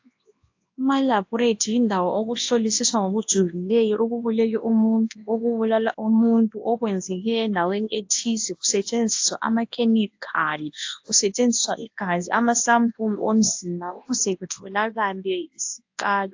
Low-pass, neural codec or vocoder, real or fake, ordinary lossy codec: 7.2 kHz; codec, 24 kHz, 0.9 kbps, WavTokenizer, large speech release; fake; AAC, 48 kbps